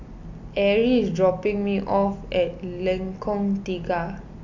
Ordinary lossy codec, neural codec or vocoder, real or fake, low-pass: none; none; real; 7.2 kHz